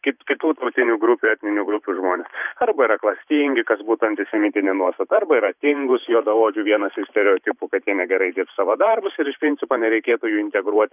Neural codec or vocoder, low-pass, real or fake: vocoder, 44.1 kHz, 128 mel bands every 512 samples, BigVGAN v2; 3.6 kHz; fake